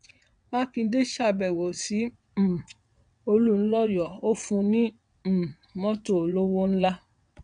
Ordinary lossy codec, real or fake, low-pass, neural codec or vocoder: none; fake; 9.9 kHz; vocoder, 22.05 kHz, 80 mel bands, WaveNeXt